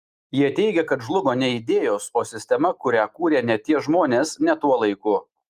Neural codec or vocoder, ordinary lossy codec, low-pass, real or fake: none; Opus, 32 kbps; 14.4 kHz; real